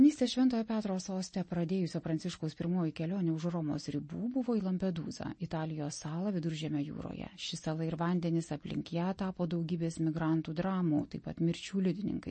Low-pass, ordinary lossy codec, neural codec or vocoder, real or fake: 10.8 kHz; MP3, 32 kbps; none; real